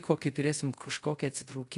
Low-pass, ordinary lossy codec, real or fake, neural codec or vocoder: 10.8 kHz; AAC, 48 kbps; fake; codec, 24 kHz, 0.5 kbps, DualCodec